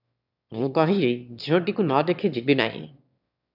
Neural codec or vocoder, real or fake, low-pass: autoencoder, 22.05 kHz, a latent of 192 numbers a frame, VITS, trained on one speaker; fake; 5.4 kHz